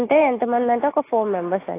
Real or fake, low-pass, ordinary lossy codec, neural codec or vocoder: real; 3.6 kHz; AAC, 24 kbps; none